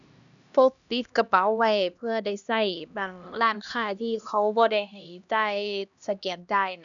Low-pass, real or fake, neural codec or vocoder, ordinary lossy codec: 7.2 kHz; fake; codec, 16 kHz, 1 kbps, X-Codec, HuBERT features, trained on LibriSpeech; none